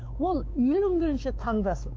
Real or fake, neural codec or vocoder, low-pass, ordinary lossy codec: fake; codec, 16 kHz, 4 kbps, X-Codec, WavLM features, trained on Multilingual LibriSpeech; none; none